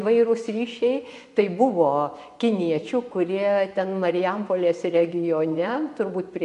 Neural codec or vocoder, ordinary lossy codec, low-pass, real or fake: vocoder, 24 kHz, 100 mel bands, Vocos; MP3, 96 kbps; 10.8 kHz; fake